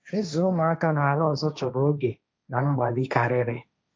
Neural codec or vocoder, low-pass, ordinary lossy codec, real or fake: codec, 16 kHz, 1.1 kbps, Voila-Tokenizer; none; none; fake